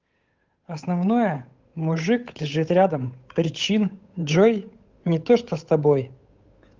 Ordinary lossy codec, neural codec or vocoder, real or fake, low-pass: Opus, 24 kbps; codec, 16 kHz, 8 kbps, FunCodec, trained on Chinese and English, 25 frames a second; fake; 7.2 kHz